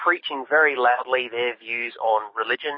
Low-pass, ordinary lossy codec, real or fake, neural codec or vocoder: 7.2 kHz; MP3, 24 kbps; fake; codec, 44.1 kHz, 7.8 kbps, DAC